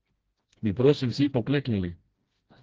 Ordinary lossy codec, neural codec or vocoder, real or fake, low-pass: Opus, 16 kbps; codec, 16 kHz, 1 kbps, FreqCodec, smaller model; fake; 7.2 kHz